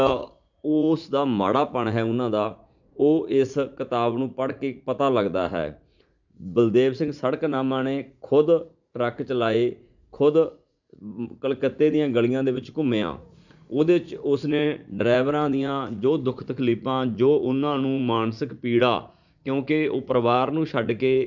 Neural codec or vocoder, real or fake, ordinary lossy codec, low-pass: vocoder, 44.1 kHz, 80 mel bands, Vocos; fake; none; 7.2 kHz